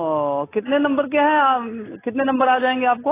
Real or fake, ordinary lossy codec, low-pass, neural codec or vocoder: real; AAC, 16 kbps; 3.6 kHz; none